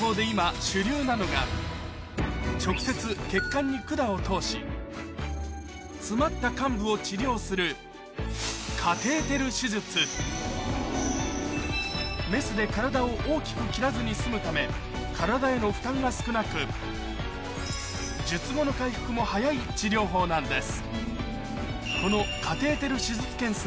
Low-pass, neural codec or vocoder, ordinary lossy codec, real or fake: none; none; none; real